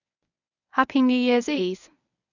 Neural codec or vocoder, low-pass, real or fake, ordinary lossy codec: codec, 24 kHz, 0.9 kbps, WavTokenizer, medium speech release version 1; 7.2 kHz; fake; none